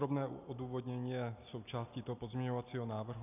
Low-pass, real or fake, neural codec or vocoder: 3.6 kHz; real; none